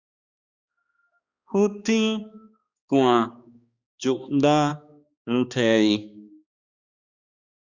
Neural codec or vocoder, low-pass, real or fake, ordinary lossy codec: codec, 16 kHz, 2 kbps, X-Codec, HuBERT features, trained on balanced general audio; 7.2 kHz; fake; Opus, 64 kbps